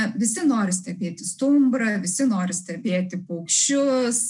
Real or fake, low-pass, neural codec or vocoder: real; 10.8 kHz; none